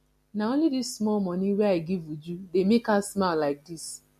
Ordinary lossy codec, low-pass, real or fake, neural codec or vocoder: MP3, 64 kbps; 14.4 kHz; real; none